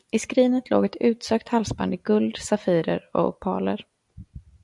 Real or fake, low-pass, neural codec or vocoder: real; 10.8 kHz; none